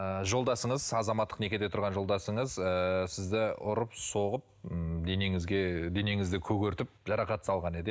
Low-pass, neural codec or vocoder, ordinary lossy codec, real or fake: none; none; none; real